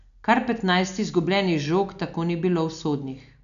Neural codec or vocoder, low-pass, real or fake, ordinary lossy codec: none; 7.2 kHz; real; none